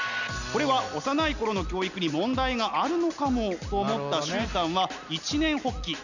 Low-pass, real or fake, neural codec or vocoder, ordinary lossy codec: 7.2 kHz; real; none; none